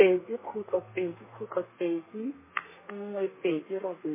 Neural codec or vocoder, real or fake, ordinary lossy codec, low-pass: codec, 32 kHz, 1.9 kbps, SNAC; fake; MP3, 16 kbps; 3.6 kHz